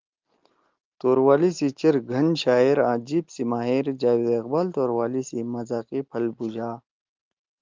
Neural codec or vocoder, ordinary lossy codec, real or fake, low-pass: none; Opus, 24 kbps; real; 7.2 kHz